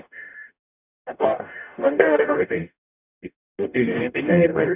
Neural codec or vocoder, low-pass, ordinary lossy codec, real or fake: codec, 44.1 kHz, 0.9 kbps, DAC; 3.6 kHz; none; fake